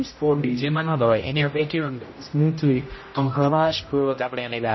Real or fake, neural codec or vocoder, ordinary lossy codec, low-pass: fake; codec, 16 kHz, 0.5 kbps, X-Codec, HuBERT features, trained on general audio; MP3, 24 kbps; 7.2 kHz